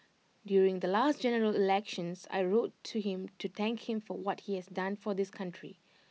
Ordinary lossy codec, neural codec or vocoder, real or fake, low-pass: none; none; real; none